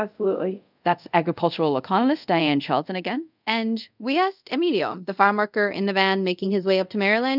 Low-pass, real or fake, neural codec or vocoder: 5.4 kHz; fake; codec, 24 kHz, 0.5 kbps, DualCodec